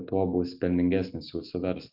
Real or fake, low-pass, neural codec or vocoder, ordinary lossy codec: real; 5.4 kHz; none; MP3, 48 kbps